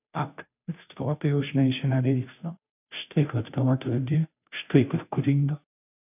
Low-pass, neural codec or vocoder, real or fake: 3.6 kHz; codec, 16 kHz, 0.5 kbps, FunCodec, trained on Chinese and English, 25 frames a second; fake